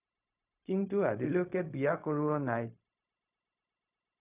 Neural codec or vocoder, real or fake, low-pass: codec, 16 kHz, 0.4 kbps, LongCat-Audio-Codec; fake; 3.6 kHz